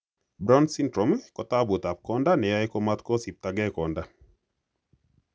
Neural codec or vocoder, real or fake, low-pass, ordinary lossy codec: none; real; none; none